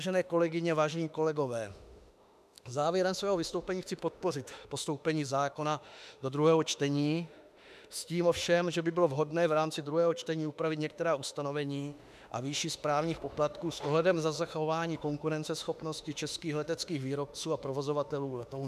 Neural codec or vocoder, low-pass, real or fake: autoencoder, 48 kHz, 32 numbers a frame, DAC-VAE, trained on Japanese speech; 14.4 kHz; fake